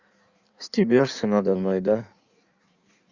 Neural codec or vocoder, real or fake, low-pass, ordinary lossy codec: codec, 16 kHz in and 24 kHz out, 1.1 kbps, FireRedTTS-2 codec; fake; 7.2 kHz; Opus, 64 kbps